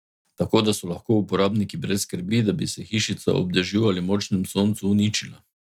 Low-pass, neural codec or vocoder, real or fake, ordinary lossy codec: 19.8 kHz; none; real; none